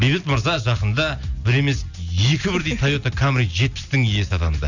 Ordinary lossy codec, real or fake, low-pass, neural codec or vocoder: none; real; 7.2 kHz; none